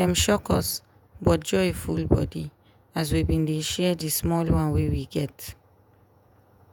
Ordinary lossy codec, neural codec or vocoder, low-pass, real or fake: none; vocoder, 48 kHz, 128 mel bands, Vocos; none; fake